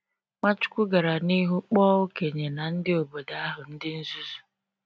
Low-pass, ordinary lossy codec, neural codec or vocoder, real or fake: none; none; none; real